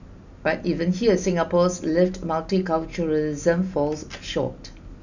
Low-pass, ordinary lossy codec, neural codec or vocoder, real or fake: 7.2 kHz; none; none; real